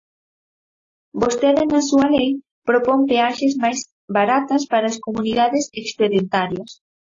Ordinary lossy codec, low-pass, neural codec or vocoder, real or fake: AAC, 32 kbps; 7.2 kHz; none; real